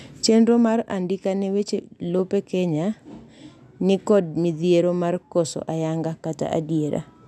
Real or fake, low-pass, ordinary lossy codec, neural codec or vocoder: real; none; none; none